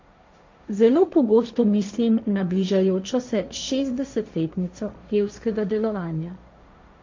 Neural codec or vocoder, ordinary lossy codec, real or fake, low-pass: codec, 16 kHz, 1.1 kbps, Voila-Tokenizer; MP3, 64 kbps; fake; 7.2 kHz